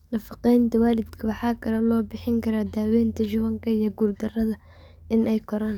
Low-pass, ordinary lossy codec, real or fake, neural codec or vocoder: 19.8 kHz; none; fake; codec, 44.1 kHz, 7.8 kbps, DAC